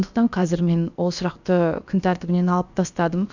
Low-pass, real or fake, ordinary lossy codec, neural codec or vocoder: 7.2 kHz; fake; none; codec, 16 kHz, about 1 kbps, DyCAST, with the encoder's durations